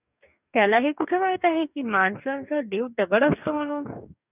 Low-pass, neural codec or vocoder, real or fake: 3.6 kHz; codec, 44.1 kHz, 2.6 kbps, DAC; fake